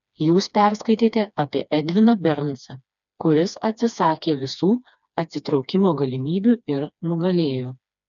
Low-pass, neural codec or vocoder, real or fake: 7.2 kHz; codec, 16 kHz, 2 kbps, FreqCodec, smaller model; fake